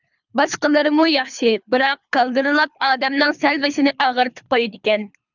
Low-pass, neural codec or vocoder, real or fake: 7.2 kHz; codec, 24 kHz, 3 kbps, HILCodec; fake